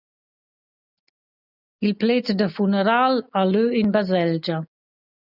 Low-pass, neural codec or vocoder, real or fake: 5.4 kHz; none; real